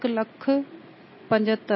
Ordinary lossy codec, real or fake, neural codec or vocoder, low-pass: MP3, 24 kbps; real; none; 7.2 kHz